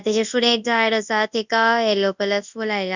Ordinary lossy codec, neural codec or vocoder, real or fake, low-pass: none; codec, 24 kHz, 0.9 kbps, WavTokenizer, large speech release; fake; 7.2 kHz